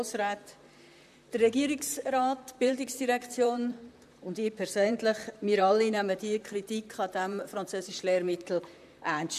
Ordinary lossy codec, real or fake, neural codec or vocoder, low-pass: none; fake; vocoder, 44.1 kHz, 128 mel bands, Pupu-Vocoder; 14.4 kHz